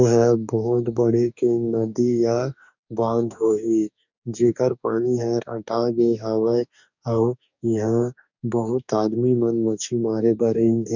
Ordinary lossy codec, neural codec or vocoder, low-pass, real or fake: none; codec, 44.1 kHz, 2.6 kbps, DAC; 7.2 kHz; fake